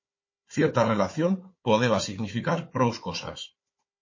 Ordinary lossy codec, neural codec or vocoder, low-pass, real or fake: MP3, 32 kbps; codec, 16 kHz, 4 kbps, FunCodec, trained on Chinese and English, 50 frames a second; 7.2 kHz; fake